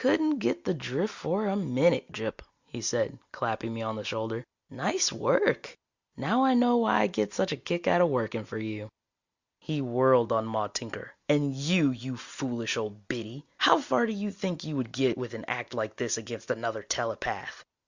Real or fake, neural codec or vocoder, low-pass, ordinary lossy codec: real; none; 7.2 kHz; Opus, 64 kbps